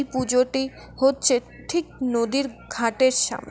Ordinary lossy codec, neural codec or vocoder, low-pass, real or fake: none; none; none; real